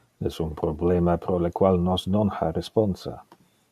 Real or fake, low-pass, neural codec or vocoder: real; 14.4 kHz; none